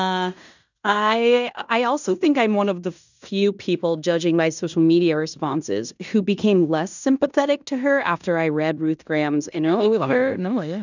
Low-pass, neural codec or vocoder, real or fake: 7.2 kHz; codec, 16 kHz in and 24 kHz out, 0.9 kbps, LongCat-Audio-Codec, fine tuned four codebook decoder; fake